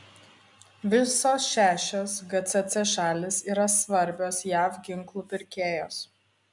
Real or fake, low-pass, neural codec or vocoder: real; 10.8 kHz; none